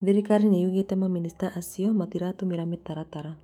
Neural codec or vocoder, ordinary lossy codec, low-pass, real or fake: autoencoder, 48 kHz, 128 numbers a frame, DAC-VAE, trained on Japanese speech; none; 19.8 kHz; fake